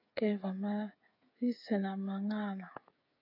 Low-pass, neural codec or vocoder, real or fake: 5.4 kHz; codec, 16 kHz, 8 kbps, FreqCodec, smaller model; fake